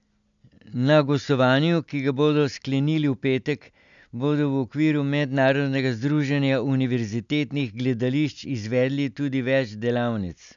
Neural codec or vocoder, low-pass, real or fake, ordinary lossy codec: none; 7.2 kHz; real; MP3, 96 kbps